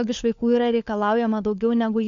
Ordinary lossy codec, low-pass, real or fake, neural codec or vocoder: AAC, 96 kbps; 7.2 kHz; fake; codec, 16 kHz, 4 kbps, FunCodec, trained on LibriTTS, 50 frames a second